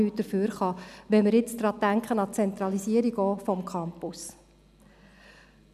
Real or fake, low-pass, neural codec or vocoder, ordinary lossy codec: real; 14.4 kHz; none; none